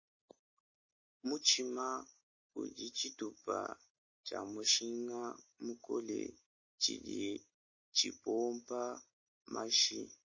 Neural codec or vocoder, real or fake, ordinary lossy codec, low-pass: none; real; MP3, 32 kbps; 7.2 kHz